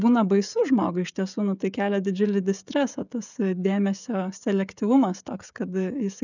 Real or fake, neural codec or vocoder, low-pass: fake; codec, 16 kHz, 16 kbps, FreqCodec, smaller model; 7.2 kHz